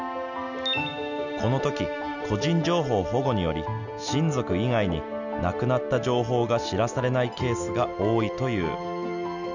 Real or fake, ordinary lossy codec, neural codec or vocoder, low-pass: real; none; none; 7.2 kHz